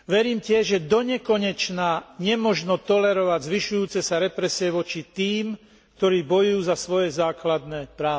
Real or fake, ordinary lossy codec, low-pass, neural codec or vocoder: real; none; none; none